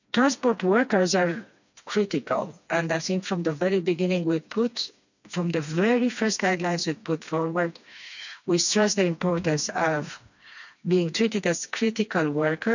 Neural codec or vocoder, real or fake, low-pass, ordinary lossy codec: codec, 16 kHz, 2 kbps, FreqCodec, smaller model; fake; 7.2 kHz; none